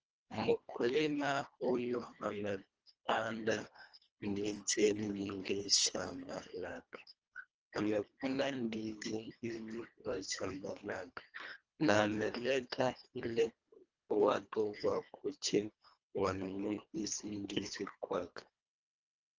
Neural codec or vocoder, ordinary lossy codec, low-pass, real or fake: codec, 24 kHz, 1.5 kbps, HILCodec; Opus, 24 kbps; 7.2 kHz; fake